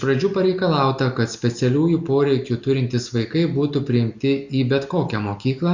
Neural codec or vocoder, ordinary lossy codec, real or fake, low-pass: none; Opus, 64 kbps; real; 7.2 kHz